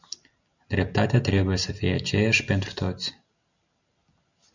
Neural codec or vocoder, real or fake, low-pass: none; real; 7.2 kHz